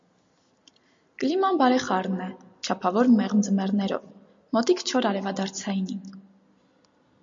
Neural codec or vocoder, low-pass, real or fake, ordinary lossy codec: none; 7.2 kHz; real; MP3, 48 kbps